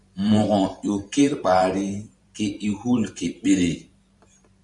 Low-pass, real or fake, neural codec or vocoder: 10.8 kHz; fake; vocoder, 44.1 kHz, 128 mel bands every 512 samples, BigVGAN v2